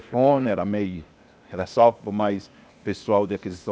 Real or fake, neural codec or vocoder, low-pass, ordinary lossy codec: fake; codec, 16 kHz, 0.8 kbps, ZipCodec; none; none